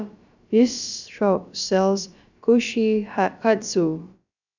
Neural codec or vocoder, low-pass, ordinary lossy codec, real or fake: codec, 16 kHz, about 1 kbps, DyCAST, with the encoder's durations; 7.2 kHz; none; fake